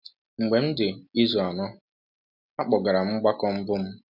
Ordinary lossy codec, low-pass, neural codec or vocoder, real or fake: none; 5.4 kHz; none; real